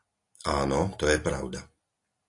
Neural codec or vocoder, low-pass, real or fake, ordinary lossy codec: none; 10.8 kHz; real; AAC, 48 kbps